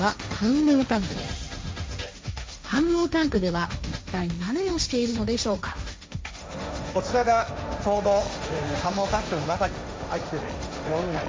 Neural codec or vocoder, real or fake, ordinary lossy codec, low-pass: codec, 16 kHz, 1.1 kbps, Voila-Tokenizer; fake; none; none